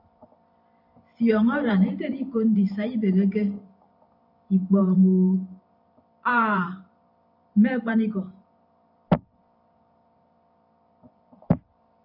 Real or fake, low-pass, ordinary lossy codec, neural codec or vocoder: fake; 5.4 kHz; AAC, 48 kbps; vocoder, 44.1 kHz, 128 mel bands every 512 samples, BigVGAN v2